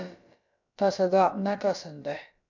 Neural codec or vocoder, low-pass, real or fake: codec, 16 kHz, about 1 kbps, DyCAST, with the encoder's durations; 7.2 kHz; fake